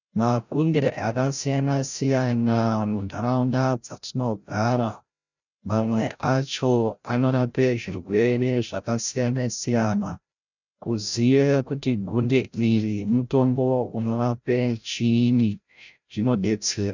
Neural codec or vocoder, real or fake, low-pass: codec, 16 kHz, 0.5 kbps, FreqCodec, larger model; fake; 7.2 kHz